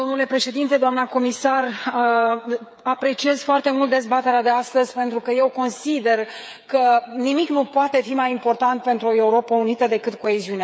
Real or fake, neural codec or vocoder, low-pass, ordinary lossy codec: fake; codec, 16 kHz, 8 kbps, FreqCodec, smaller model; none; none